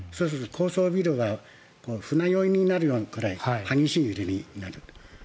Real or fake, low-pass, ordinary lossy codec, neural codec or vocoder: real; none; none; none